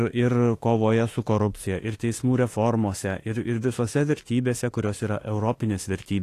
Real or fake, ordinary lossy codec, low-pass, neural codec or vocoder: fake; AAC, 48 kbps; 14.4 kHz; autoencoder, 48 kHz, 32 numbers a frame, DAC-VAE, trained on Japanese speech